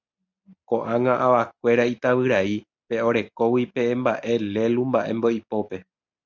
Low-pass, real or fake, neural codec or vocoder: 7.2 kHz; real; none